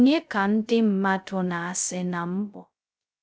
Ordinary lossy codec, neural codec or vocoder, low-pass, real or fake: none; codec, 16 kHz, 0.2 kbps, FocalCodec; none; fake